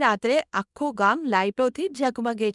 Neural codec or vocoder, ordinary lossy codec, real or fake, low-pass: codec, 24 kHz, 0.9 kbps, WavTokenizer, small release; none; fake; 10.8 kHz